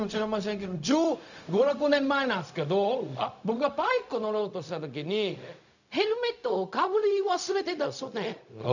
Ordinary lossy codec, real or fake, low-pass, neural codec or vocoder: none; fake; 7.2 kHz; codec, 16 kHz, 0.4 kbps, LongCat-Audio-Codec